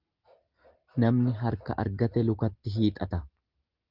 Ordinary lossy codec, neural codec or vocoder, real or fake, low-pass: Opus, 32 kbps; none; real; 5.4 kHz